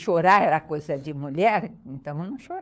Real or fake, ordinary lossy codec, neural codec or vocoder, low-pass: fake; none; codec, 16 kHz, 4 kbps, FunCodec, trained on LibriTTS, 50 frames a second; none